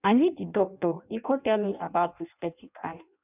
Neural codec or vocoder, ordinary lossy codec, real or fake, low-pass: codec, 16 kHz in and 24 kHz out, 0.6 kbps, FireRedTTS-2 codec; none; fake; 3.6 kHz